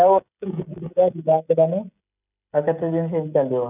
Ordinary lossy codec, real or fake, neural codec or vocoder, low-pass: none; fake; codec, 16 kHz, 16 kbps, FreqCodec, smaller model; 3.6 kHz